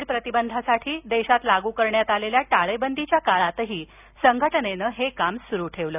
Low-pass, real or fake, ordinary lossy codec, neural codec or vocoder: 3.6 kHz; real; none; none